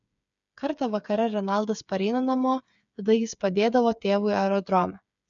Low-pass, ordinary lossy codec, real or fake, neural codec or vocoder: 7.2 kHz; MP3, 96 kbps; fake; codec, 16 kHz, 8 kbps, FreqCodec, smaller model